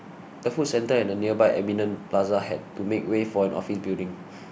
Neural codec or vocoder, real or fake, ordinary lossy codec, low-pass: none; real; none; none